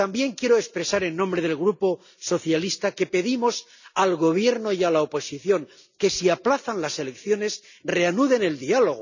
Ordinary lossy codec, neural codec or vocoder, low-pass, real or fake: none; none; 7.2 kHz; real